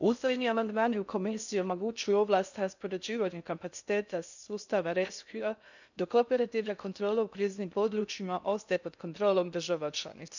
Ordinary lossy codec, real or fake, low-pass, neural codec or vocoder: none; fake; 7.2 kHz; codec, 16 kHz in and 24 kHz out, 0.6 kbps, FocalCodec, streaming, 4096 codes